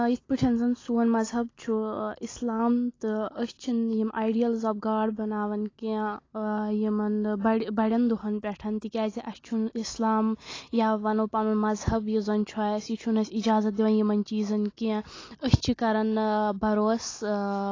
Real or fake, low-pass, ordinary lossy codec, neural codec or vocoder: real; 7.2 kHz; AAC, 32 kbps; none